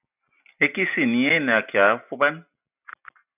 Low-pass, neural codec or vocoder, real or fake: 3.6 kHz; none; real